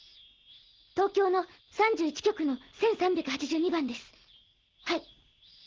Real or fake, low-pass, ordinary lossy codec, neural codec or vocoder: real; 7.2 kHz; Opus, 16 kbps; none